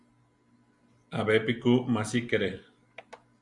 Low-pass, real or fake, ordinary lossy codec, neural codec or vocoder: 10.8 kHz; real; Opus, 64 kbps; none